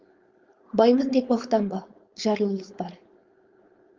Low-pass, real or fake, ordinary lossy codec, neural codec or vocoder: 7.2 kHz; fake; Opus, 32 kbps; codec, 16 kHz, 4.8 kbps, FACodec